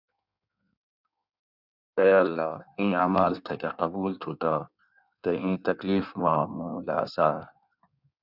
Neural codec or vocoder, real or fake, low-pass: codec, 16 kHz in and 24 kHz out, 1.1 kbps, FireRedTTS-2 codec; fake; 5.4 kHz